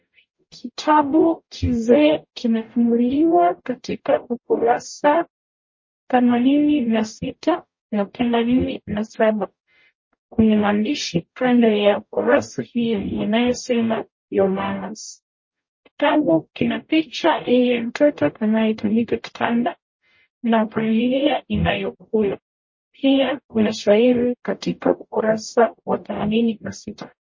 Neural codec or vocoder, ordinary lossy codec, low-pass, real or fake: codec, 44.1 kHz, 0.9 kbps, DAC; MP3, 32 kbps; 7.2 kHz; fake